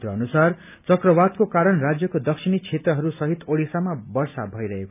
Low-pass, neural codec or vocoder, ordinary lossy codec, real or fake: 3.6 kHz; none; none; real